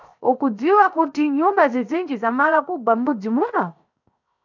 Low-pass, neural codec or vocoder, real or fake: 7.2 kHz; codec, 16 kHz, 0.7 kbps, FocalCodec; fake